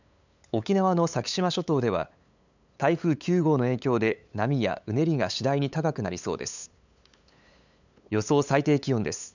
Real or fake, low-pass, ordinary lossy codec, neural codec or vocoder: fake; 7.2 kHz; none; codec, 16 kHz, 8 kbps, FunCodec, trained on LibriTTS, 25 frames a second